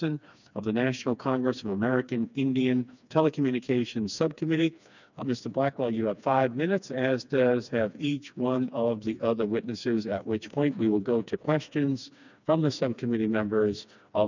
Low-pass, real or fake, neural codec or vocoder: 7.2 kHz; fake; codec, 16 kHz, 2 kbps, FreqCodec, smaller model